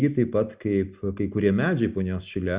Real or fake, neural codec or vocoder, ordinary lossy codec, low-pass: real; none; Opus, 64 kbps; 3.6 kHz